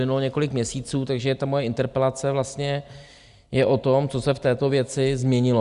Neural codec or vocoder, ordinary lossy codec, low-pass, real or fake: none; Opus, 64 kbps; 10.8 kHz; real